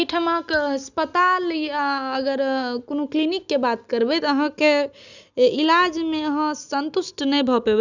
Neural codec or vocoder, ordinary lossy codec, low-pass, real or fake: none; none; 7.2 kHz; real